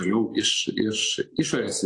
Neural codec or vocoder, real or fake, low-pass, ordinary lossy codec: none; real; 10.8 kHz; AAC, 48 kbps